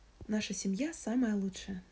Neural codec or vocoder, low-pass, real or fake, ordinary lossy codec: none; none; real; none